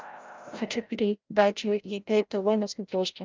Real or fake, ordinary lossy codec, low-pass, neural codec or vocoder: fake; Opus, 24 kbps; 7.2 kHz; codec, 16 kHz, 0.5 kbps, FreqCodec, larger model